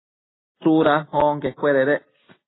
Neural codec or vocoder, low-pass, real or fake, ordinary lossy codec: none; 7.2 kHz; real; AAC, 16 kbps